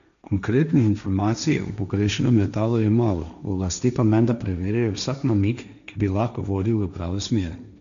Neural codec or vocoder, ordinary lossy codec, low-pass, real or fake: codec, 16 kHz, 1.1 kbps, Voila-Tokenizer; none; 7.2 kHz; fake